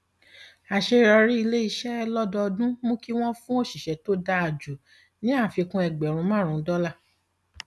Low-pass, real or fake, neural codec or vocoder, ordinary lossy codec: none; real; none; none